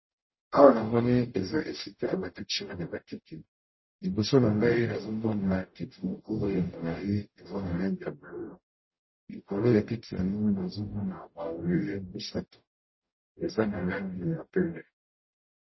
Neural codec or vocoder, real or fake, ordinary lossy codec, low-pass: codec, 44.1 kHz, 0.9 kbps, DAC; fake; MP3, 24 kbps; 7.2 kHz